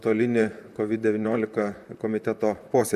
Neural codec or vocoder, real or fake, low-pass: vocoder, 44.1 kHz, 128 mel bands, Pupu-Vocoder; fake; 14.4 kHz